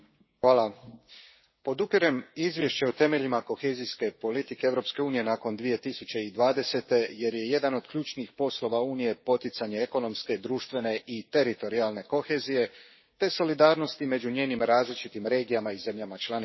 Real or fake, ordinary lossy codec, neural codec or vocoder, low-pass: fake; MP3, 24 kbps; codec, 16 kHz, 6 kbps, DAC; 7.2 kHz